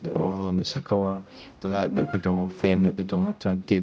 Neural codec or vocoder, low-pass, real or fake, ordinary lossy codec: codec, 16 kHz, 0.5 kbps, X-Codec, HuBERT features, trained on general audio; none; fake; none